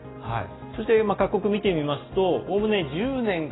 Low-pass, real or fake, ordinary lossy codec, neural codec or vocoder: 7.2 kHz; real; AAC, 16 kbps; none